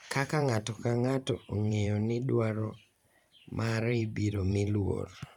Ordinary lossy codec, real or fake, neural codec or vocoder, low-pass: none; fake; vocoder, 44.1 kHz, 128 mel bands every 512 samples, BigVGAN v2; 19.8 kHz